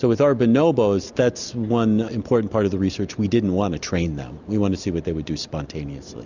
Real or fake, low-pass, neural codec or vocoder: real; 7.2 kHz; none